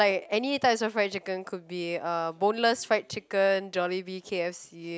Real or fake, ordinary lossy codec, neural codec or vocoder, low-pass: real; none; none; none